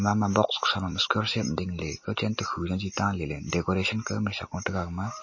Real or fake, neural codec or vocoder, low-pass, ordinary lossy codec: real; none; 7.2 kHz; MP3, 32 kbps